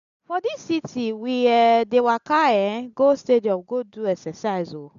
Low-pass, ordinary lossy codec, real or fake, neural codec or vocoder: 7.2 kHz; none; real; none